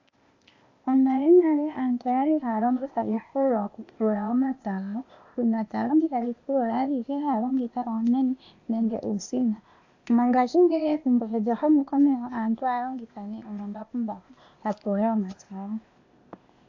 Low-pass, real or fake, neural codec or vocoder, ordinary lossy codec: 7.2 kHz; fake; codec, 16 kHz, 0.8 kbps, ZipCodec; AAC, 48 kbps